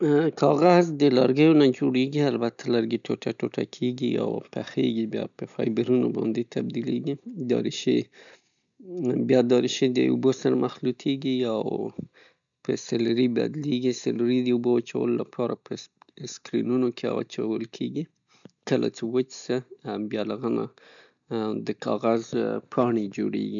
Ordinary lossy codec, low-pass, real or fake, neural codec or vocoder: none; 7.2 kHz; real; none